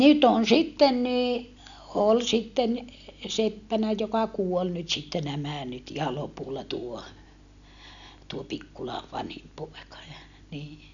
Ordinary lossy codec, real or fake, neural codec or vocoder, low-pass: none; real; none; 7.2 kHz